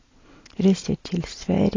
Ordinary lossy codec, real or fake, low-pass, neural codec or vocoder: AAC, 32 kbps; real; 7.2 kHz; none